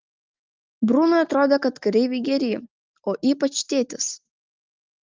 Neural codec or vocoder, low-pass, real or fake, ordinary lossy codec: none; 7.2 kHz; real; Opus, 24 kbps